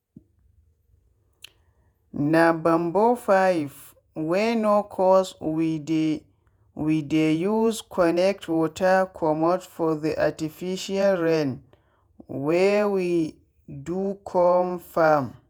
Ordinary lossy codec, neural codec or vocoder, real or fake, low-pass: none; vocoder, 48 kHz, 128 mel bands, Vocos; fake; none